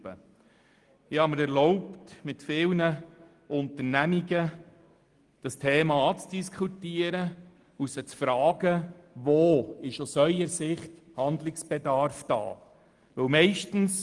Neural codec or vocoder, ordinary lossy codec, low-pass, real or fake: none; Opus, 24 kbps; 10.8 kHz; real